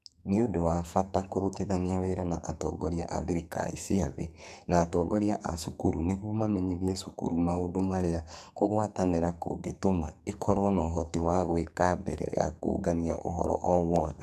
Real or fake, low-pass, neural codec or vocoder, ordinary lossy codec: fake; 14.4 kHz; codec, 44.1 kHz, 2.6 kbps, SNAC; none